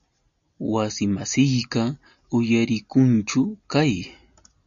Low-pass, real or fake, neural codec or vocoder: 7.2 kHz; real; none